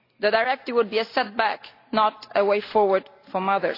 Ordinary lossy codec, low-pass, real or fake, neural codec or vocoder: AAC, 32 kbps; 5.4 kHz; real; none